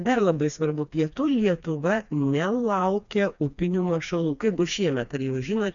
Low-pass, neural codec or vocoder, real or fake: 7.2 kHz; codec, 16 kHz, 2 kbps, FreqCodec, smaller model; fake